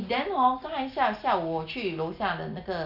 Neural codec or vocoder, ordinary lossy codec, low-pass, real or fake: none; none; 5.4 kHz; real